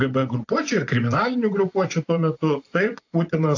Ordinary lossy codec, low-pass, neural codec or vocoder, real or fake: AAC, 48 kbps; 7.2 kHz; vocoder, 24 kHz, 100 mel bands, Vocos; fake